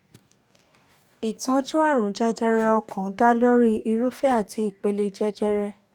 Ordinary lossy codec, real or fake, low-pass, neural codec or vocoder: none; fake; 19.8 kHz; codec, 44.1 kHz, 2.6 kbps, DAC